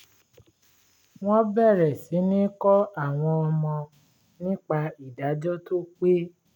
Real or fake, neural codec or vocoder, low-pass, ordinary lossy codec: real; none; 19.8 kHz; none